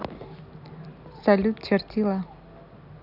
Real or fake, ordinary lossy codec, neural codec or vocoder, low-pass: real; none; none; 5.4 kHz